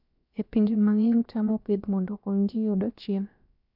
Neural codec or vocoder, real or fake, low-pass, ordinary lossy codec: codec, 16 kHz, about 1 kbps, DyCAST, with the encoder's durations; fake; 5.4 kHz; none